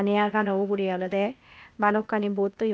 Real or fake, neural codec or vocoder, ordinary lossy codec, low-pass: fake; codec, 16 kHz, 0.8 kbps, ZipCodec; none; none